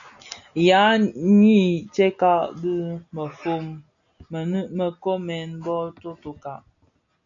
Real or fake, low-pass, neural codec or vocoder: real; 7.2 kHz; none